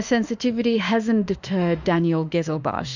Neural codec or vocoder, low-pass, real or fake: autoencoder, 48 kHz, 32 numbers a frame, DAC-VAE, trained on Japanese speech; 7.2 kHz; fake